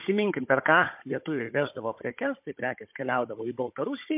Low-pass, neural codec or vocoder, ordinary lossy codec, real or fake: 3.6 kHz; codec, 16 kHz, 16 kbps, FunCodec, trained on Chinese and English, 50 frames a second; MP3, 32 kbps; fake